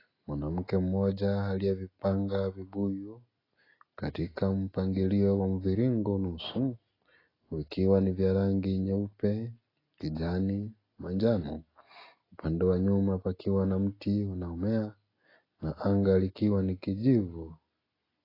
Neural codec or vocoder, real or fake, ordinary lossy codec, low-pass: none; real; AAC, 24 kbps; 5.4 kHz